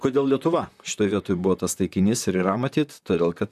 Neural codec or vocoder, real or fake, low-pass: vocoder, 44.1 kHz, 128 mel bands, Pupu-Vocoder; fake; 14.4 kHz